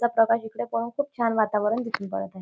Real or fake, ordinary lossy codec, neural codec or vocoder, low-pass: real; none; none; none